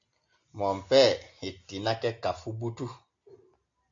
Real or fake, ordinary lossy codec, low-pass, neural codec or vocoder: real; MP3, 48 kbps; 7.2 kHz; none